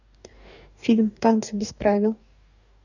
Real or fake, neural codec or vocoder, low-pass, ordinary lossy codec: fake; codec, 44.1 kHz, 2.6 kbps, DAC; 7.2 kHz; none